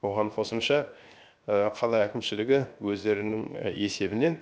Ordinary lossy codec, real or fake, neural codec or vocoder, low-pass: none; fake; codec, 16 kHz, 0.7 kbps, FocalCodec; none